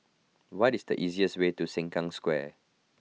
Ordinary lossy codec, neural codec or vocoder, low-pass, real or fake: none; none; none; real